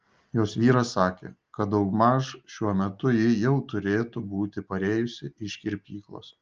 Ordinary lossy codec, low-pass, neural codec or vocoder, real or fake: Opus, 24 kbps; 7.2 kHz; none; real